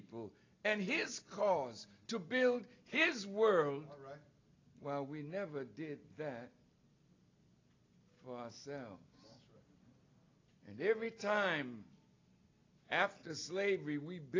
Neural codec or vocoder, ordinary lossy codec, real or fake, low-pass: none; AAC, 32 kbps; real; 7.2 kHz